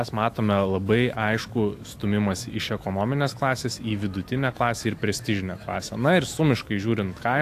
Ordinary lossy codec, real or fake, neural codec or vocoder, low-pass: AAC, 64 kbps; fake; autoencoder, 48 kHz, 128 numbers a frame, DAC-VAE, trained on Japanese speech; 14.4 kHz